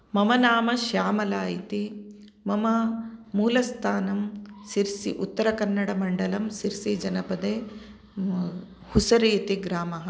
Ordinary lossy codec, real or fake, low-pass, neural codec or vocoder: none; real; none; none